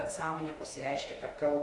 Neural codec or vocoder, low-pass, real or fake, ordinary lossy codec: autoencoder, 48 kHz, 32 numbers a frame, DAC-VAE, trained on Japanese speech; 10.8 kHz; fake; AAC, 48 kbps